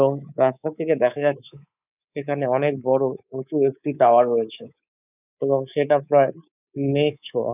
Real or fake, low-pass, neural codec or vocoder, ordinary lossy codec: fake; 3.6 kHz; codec, 24 kHz, 3.1 kbps, DualCodec; none